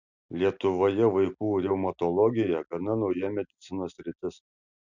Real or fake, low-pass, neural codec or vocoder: real; 7.2 kHz; none